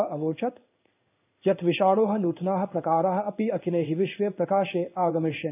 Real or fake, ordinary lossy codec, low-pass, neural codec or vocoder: fake; none; 3.6 kHz; codec, 16 kHz in and 24 kHz out, 1 kbps, XY-Tokenizer